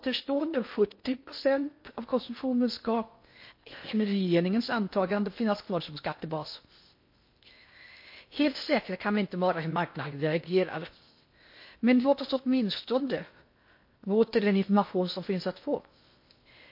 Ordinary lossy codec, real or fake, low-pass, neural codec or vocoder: MP3, 32 kbps; fake; 5.4 kHz; codec, 16 kHz in and 24 kHz out, 0.6 kbps, FocalCodec, streaming, 2048 codes